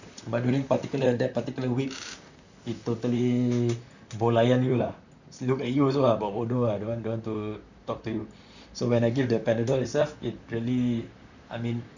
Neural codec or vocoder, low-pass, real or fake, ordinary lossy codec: vocoder, 44.1 kHz, 128 mel bands, Pupu-Vocoder; 7.2 kHz; fake; none